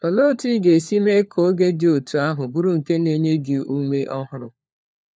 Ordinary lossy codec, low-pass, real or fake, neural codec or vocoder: none; none; fake; codec, 16 kHz, 4 kbps, FunCodec, trained on LibriTTS, 50 frames a second